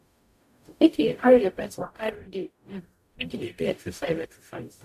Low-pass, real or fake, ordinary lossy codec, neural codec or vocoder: 14.4 kHz; fake; none; codec, 44.1 kHz, 0.9 kbps, DAC